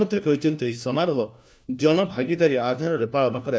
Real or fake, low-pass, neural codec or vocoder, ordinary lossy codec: fake; none; codec, 16 kHz, 1 kbps, FunCodec, trained on LibriTTS, 50 frames a second; none